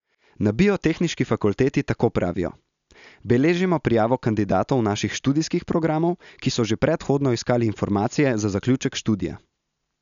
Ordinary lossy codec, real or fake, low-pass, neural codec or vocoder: MP3, 96 kbps; real; 7.2 kHz; none